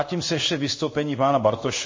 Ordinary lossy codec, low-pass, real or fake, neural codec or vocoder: MP3, 32 kbps; 7.2 kHz; fake; codec, 16 kHz in and 24 kHz out, 1 kbps, XY-Tokenizer